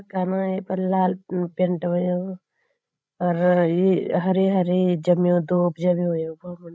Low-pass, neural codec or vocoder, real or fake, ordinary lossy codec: none; codec, 16 kHz, 16 kbps, FreqCodec, larger model; fake; none